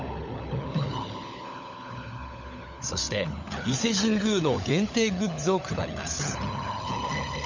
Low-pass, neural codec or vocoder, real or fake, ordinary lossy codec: 7.2 kHz; codec, 16 kHz, 16 kbps, FunCodec, trained on LibriTTS, 50 frames a second; fake; none